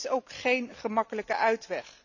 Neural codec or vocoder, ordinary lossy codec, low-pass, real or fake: none; none; 7.2 kHz; real